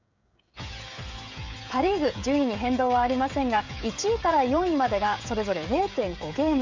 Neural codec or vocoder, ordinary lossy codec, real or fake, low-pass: codec, 16 kHz, 16 kbps, FreqCodec, smaller model; MP3, 64 kbps; fake; 7.2 kHz